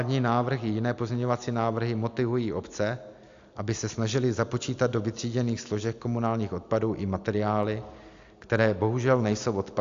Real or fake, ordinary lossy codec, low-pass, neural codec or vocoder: real; AAC, 64 kbps; 7.2 kHz; none